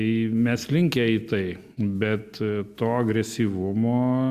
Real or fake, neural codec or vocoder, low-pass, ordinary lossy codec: real; none; 14.4 kHz; Opus, 64 kbps